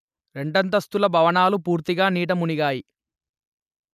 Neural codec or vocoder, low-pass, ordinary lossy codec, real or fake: none; 14.4 kHz; none; real